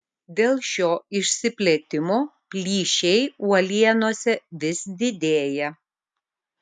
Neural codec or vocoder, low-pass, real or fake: none; 10.8 kHz; real